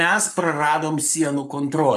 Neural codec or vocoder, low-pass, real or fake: codec, 44.1 kHz, 7.8 kbps, Pupu-Codec; 14.4 kHz; fake